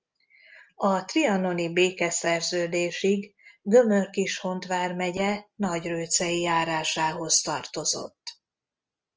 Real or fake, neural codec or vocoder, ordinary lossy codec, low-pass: real; none; Opus, 24 kbps; 7.2 kHz